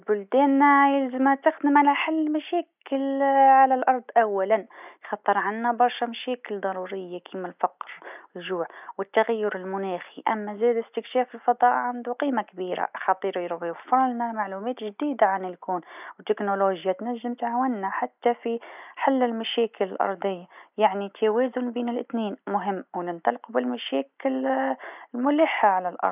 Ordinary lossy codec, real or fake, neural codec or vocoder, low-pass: none; real; none; 3.6 kHz